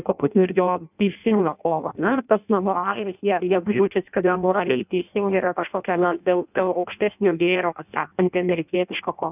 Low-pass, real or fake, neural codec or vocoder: 3.6 kHz; fake; codec, 16 kHz in and 24 kHz out, 0.6 kbps, FireRedTTS-2 codec